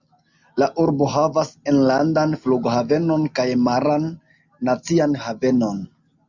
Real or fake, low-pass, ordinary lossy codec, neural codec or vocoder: real; 7.2 kHz; Opus, 64 kbps; none